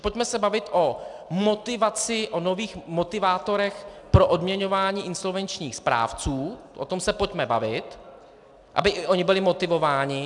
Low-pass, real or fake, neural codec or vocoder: 10.8 kHz; real; none